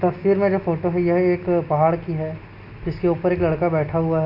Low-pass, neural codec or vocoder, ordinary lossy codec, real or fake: 5.4 kHz; none; none; real